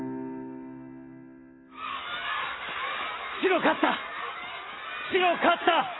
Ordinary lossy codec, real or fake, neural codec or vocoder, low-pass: AAC, 16 kbps; real; none; 7.2 kHz